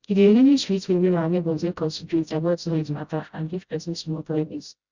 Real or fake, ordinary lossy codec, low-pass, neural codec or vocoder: fake; Opus, 64 kbps; 7.2 kHz; codec, 16 kHz, 0.5 kbps, FreqCodec, smaller model